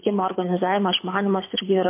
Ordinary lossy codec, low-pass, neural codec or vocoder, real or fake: MP3, 24 kbps; 3.6 kHz; codec, 24 kHz, 6 kbps, HILCodec; fake